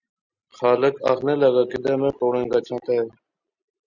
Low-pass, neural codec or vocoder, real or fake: 7.2 kHz; none; real